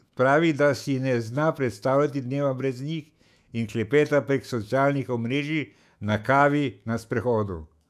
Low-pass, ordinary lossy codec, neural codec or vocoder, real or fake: 14.4 kHz; none; autoencoder, 48 kHz, 128 numbers a frame, DAC-VAE, trained on Japanese speech; fake